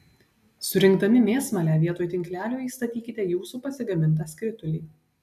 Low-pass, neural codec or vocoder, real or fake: 14.4 kHz; none; real